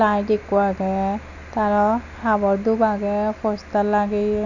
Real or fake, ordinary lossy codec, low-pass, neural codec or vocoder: real; none; 7.2 kHz; none